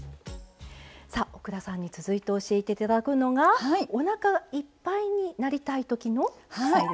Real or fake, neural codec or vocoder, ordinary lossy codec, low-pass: real; none; none; none